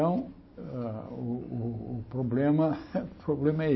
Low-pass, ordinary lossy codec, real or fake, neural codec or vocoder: 7.2 kHz; MP3, 24 kbps; real; none